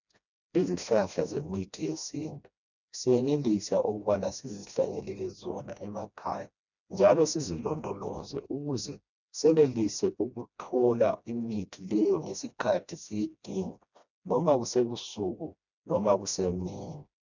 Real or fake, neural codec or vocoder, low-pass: fake; codec, 16 kHz, 1 kbps, FreqCodec, smaller model; 7.2 kHz